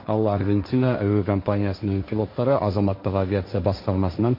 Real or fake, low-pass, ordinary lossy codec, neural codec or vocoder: fake; 5.4 kHz; MP3, 32 kbps; codec, 16 kHz, 1.1 kbps, Voila-Tokenizer